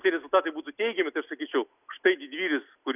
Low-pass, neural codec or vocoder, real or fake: 3.6 kHz; none; real